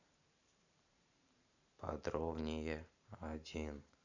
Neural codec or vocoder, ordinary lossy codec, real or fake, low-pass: vocoder, 44.1 kHz, 128 mel bands every 512 samples, BigVGAN v2; none; fake; 7.2 kHz